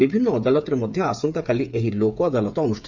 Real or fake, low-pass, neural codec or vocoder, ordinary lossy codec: fake; 7.2 kHz; codec, 16 kHz, 8 kbps, FreqCodec, smaller model; none